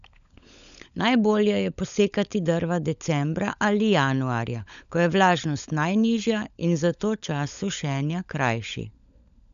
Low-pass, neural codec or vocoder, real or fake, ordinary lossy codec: 7.2 kHz; codec, 16 kHz, 16 kbps, FunCodec, trained on LibriTTS, 50 frames a second; fake; none